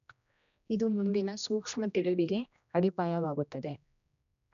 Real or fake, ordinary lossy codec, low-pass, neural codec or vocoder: fake; none; 7.2 kHz; codec, 16 kHz, 1 kbps, X-Codec, HuBERT features, trained on general audio